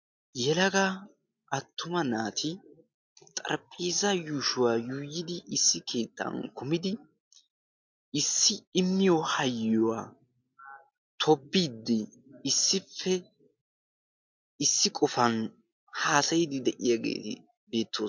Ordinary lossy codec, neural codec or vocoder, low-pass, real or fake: MP3, 64 kbps; none; 7.2 kHz; real